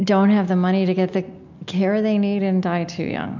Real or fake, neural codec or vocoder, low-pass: real; none; 7.2 kHz